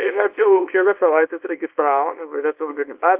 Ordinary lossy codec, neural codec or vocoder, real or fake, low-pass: Opus, 32 kbps; codec, 24 kHz, 0.9 kbps, WavTokenizer, small release; fake; 3.6 kHz